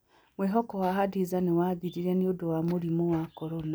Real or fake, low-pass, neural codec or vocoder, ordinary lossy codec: real; none; none; none